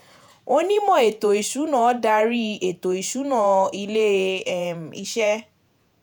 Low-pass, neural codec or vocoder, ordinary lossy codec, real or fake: none; vocoder, 48 kHz, 128 mel bands, Vocos; none; fake